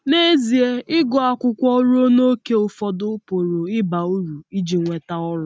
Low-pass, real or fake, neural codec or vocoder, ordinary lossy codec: none; real; none; none